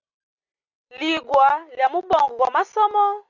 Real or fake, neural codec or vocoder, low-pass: real; none; 7.2 kHz